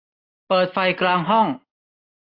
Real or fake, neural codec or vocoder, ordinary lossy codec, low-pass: real; none; AAC, 24 kbps; 5.4 kHz